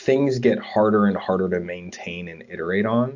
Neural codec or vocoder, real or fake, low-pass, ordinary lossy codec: none; real; 7.2 kHz; AAC, 48 kbps